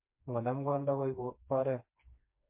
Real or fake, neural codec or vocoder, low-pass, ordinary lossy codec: fake; codec, 16 kHz, 2 kbps, FreqCodec, smaller model; 3.6 kHz; MP3, 32 kbps